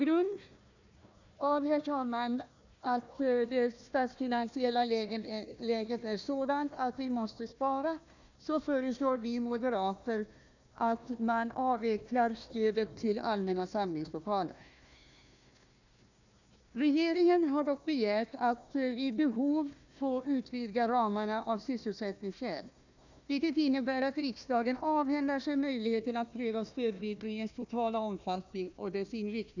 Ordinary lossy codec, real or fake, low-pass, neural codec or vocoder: none; fake; 7.2 kHz; codec, 16 kHz, 1 kbps, FunCodec, trained on Chinese and English, 50 frames a second